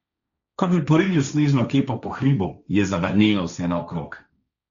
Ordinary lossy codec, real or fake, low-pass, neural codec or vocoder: none; fake; 7.2 kHz; codec, 16 kHz, 1.1 kbps, Voila-Tokenizer